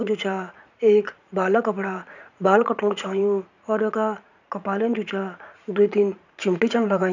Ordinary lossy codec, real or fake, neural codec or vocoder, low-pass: none; fake; vocoder, 44.1 kHz, 80 mel bands, Vocos; 7.2 kHz